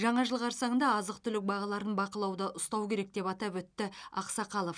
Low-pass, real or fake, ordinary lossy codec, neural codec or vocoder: 9.9 kHz; real; none; none